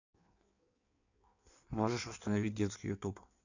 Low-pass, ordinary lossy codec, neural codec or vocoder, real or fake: 7.2 kHz; none; codec, 16 kHz in and 24 kHz out, 1.1 kbps, FireRedTTS-2 codec; fake